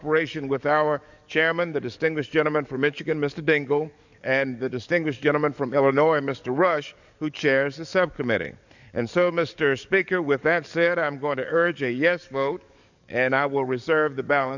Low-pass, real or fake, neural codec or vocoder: 7.2 kHz; fake; codec, 44.1 kHz, 7.8 kbps, DAC